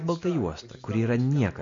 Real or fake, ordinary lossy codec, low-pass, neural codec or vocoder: real; MP3, 48 kbps; 7.2 kHz; none